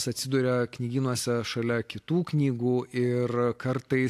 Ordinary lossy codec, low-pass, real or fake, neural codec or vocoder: AAC, 64 kbps; 14.4 kHz; real; none